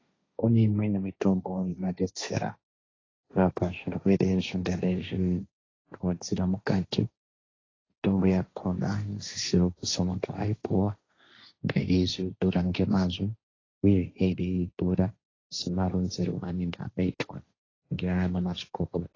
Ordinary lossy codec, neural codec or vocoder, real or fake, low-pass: AAC, 32 kbps; codec, 16 kHz, 1.1 kbps, Voila-Tokenizer; fake; 7.2 kHz